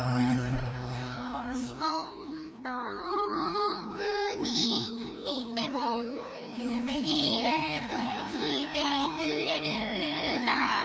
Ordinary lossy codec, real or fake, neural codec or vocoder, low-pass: none; fake; codec, 16 kHz, 1 kbps, FreqCodec, larger model; none